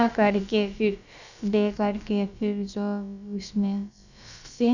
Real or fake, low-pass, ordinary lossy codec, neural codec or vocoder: fake; 7.2 kHz; none; codec, 16 kHz, about 1 kbps, DyCAST, with the encoder's durations